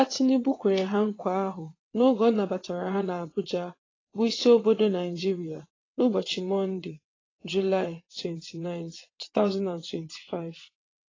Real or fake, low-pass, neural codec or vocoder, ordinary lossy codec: fake; 7.2 kHz; vocoder, 22.05 kHz, 80 mel bands, WaveNeXt; AAC, 32 kbps